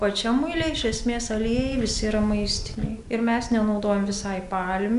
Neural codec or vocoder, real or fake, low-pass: none; real; 10.8 kHz